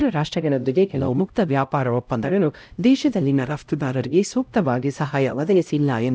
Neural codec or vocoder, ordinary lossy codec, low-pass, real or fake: codec, 16 kHz, 0.5 kbps, X-Codec, HuBERT features, trained on LibriSpeech; none; none; fake